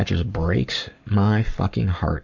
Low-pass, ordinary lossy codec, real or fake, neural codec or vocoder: 7.2 kHz; MP3, 64 kbps; fake; codec, 44.1 kHz, 7.8 kbps, Pupu-Codec